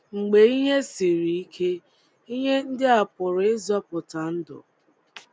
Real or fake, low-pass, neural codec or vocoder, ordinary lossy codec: real; none; none; none